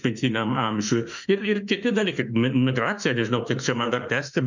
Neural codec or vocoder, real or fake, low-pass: autoencoder, 48 kHz, 32 numbers a frame, DAC-VAE, trained on Japanese speech; fake; 7.2 kHz